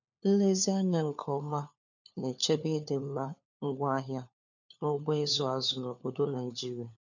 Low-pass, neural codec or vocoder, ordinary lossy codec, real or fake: 7.2 kHz; codec, 16 kHz, 4 kbps, FunCodec, trained on LibriTTS, 50 frames a second; none; fake